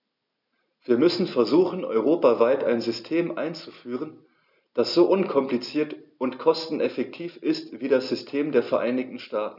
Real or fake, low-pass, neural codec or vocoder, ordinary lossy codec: real; 5.4 kHz; none; none